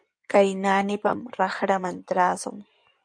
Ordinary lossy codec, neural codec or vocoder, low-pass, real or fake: MP3, 64 kbps; codec, 16 kHz in and 24 kHz out, 2.2 kbps, FireRedTTS-2 codec; 9.9 kHz; fake